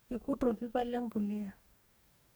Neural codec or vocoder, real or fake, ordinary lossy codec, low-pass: codec, 44.1 kHz, 2.6 kbps, DAC; fake; none; none